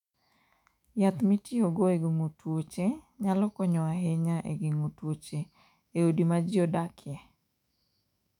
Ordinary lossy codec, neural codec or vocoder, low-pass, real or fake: none; autoencoder, 48 kHz, 128 numbers a frame, DAC-VAE, trained on Japanese speech; 19.8 kHz; fake